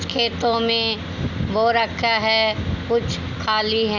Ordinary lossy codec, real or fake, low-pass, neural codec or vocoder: none; real; 7.2 kHz; none